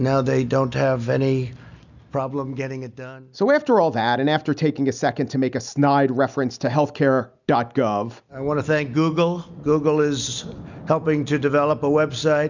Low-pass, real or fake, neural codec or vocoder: 7.2 kHz; real; none